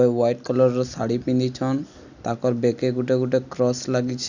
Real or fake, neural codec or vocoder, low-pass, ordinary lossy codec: real; none; 7.2 kHz; none